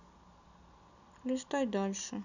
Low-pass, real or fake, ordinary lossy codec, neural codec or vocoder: 7.2 kHz; real; none; none